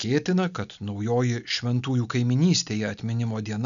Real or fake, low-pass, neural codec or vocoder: real; 7.2 kHz; none